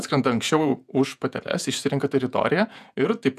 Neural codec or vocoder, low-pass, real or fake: vocoder, 48 kHz, 128 mel bands, Vocos; 14.4 kHz; fake